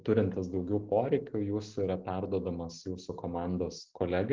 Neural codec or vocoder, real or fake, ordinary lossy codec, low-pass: none; real; Opus, 16 kbps; 7.2 kHz